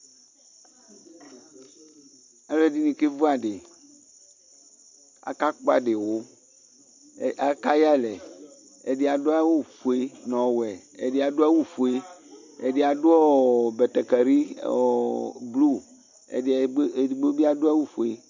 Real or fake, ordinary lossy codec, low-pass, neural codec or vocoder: real; MP3, 64 kbps; 7.2 kHz; none